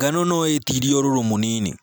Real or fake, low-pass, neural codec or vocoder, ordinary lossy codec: real; none; none; none